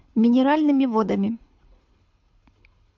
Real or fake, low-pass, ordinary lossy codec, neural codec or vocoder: fake; 7.2 kHz; MP3, 64 kbps; codec, 24 kHz, 6 kbps, HILCodec